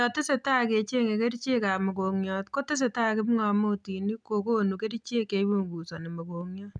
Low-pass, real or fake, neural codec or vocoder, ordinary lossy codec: 9.9 kHz; real; none; none